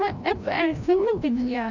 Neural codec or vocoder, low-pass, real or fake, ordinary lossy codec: codec, 16 kHz, 0.5 kbps, FreqCodec, larger model; 7.2 kHz; fake; none